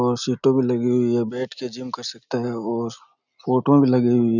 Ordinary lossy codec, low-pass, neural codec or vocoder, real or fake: none; 7.2 kHz; none; real